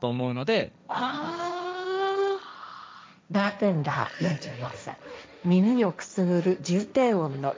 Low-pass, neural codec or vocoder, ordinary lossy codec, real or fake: none; codec, 16 kHz, 1.1 kbps, Voila-Tokenizer; none; fake